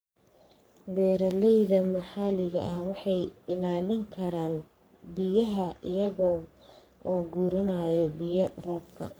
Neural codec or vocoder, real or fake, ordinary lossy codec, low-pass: codec, 44.1 kHz, 3.4 kbps, Pupu-Codec; fake; none; none